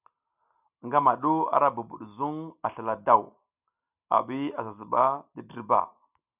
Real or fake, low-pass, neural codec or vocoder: real; 3.6 kHz; none